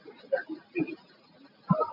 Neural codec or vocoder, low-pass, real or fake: none; 5.4 kHz; real